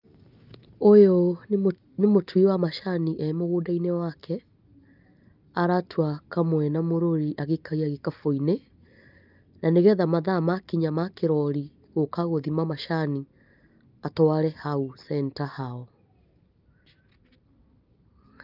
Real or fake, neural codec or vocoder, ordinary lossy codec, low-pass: real; none; Opus, 32 kbps; 5.4 kHz